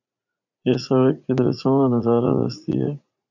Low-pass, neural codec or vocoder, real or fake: 7.2 kHz; vocoder, 44.1 kHz, 80 mel bands, Vocos; fake